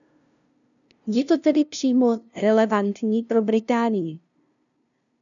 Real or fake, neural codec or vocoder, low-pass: fake; codec, 16 kHz, 0.5 kbps, FunCodec, trained on LibriTTS, 25 frames a second; 7.2 kHz